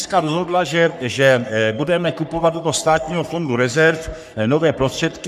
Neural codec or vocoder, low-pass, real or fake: codec, 44.1 kHz, 3.4 kbps, Pupu-Codec; 14.4 kHz; fake